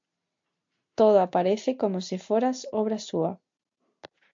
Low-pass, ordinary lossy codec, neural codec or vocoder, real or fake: 7.2 kHz; MP3, 48 kbps; none; real